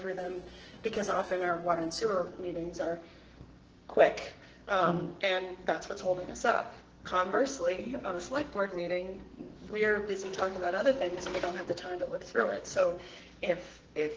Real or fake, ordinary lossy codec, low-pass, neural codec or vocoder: fake; Opus, 16 kbps; 7.2 kHz; codec, 44.1 kHz, 2.6 kbps, SNAC